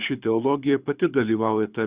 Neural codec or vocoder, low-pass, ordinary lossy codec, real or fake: codec, 24 kHz, 6 kbps, HILCodec; 3.6 kHz; Opus, 64 kbps; fake